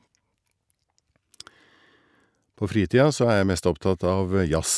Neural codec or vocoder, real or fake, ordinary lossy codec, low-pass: none; real; none; none